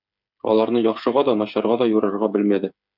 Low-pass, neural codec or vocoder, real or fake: 5.4 kHz; codec, 16 kHz, 8 kbps, FreqCodec, smaller model; fake